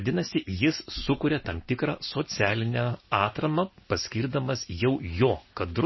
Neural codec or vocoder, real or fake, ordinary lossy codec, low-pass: none; real; MP3, 24 kbps; 7.2 kHz